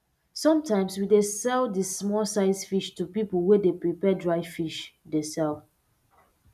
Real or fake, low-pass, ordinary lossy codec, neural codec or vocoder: real; 14.4 kHz; none; none